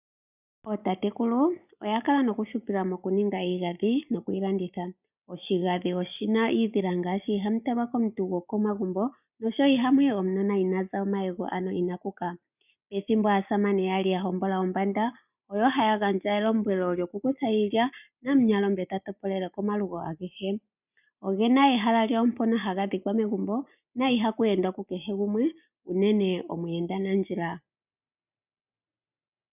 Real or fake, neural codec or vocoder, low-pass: real; none; 3.6 kHz